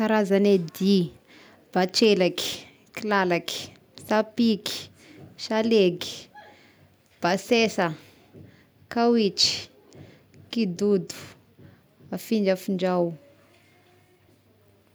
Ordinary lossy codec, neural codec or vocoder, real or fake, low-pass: none; none; real; none